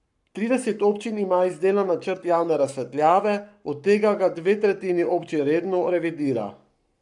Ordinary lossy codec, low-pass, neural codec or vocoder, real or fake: none; 10.8 kHz; codec, 44.1 kHz, 7.8 kbps, Pupu-Codec; fake